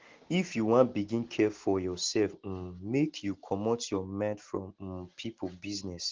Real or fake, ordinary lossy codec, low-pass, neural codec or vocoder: real; Opus, 16 kbps; 7.2 kHz; none